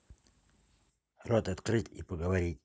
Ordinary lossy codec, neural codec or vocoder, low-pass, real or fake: none; none; none; real